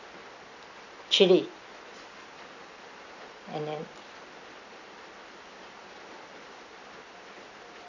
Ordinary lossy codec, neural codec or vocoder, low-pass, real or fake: none; none; 7.2 kHz; real